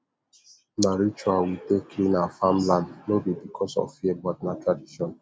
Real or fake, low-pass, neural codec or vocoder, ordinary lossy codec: real; none; none; none